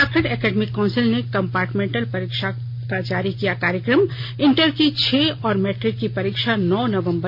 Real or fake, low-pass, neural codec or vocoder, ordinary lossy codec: real; 5.4 kHz; none; MP3, 48 kbps